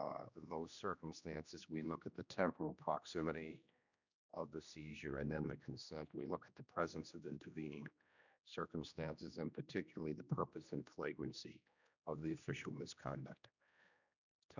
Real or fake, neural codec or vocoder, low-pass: fake; codec, 16 kHz, 1 kbps, X-Codec, HuBERT features, trained on general audio; 7.2 kHz